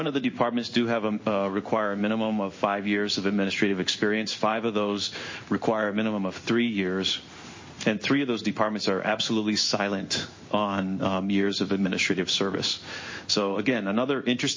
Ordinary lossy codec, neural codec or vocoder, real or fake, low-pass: MP3, 32 kbps; codec, 16 kHz in and 24 kHz out, 1 kbps, XY-Tokenizer; fake; 7.2 kHz